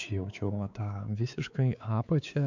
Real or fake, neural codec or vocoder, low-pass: fake; codec, 16 kHz, 4 kbps, X-Codec, WavLM features, trained on Multilingual LibriSpeech; 7.2 kHz